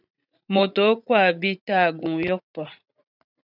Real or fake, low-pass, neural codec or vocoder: fake; 5.4 kHz; vocoder, 44.1 kHz, 80 mel bands, Vocos